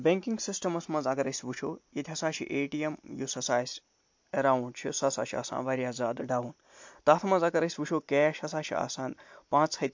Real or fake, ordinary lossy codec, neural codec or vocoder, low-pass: real; MP3, 48 kbps; none; 7.2 kHz